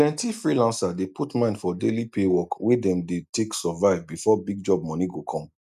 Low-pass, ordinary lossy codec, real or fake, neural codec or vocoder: 14.4 kHz; none; real; none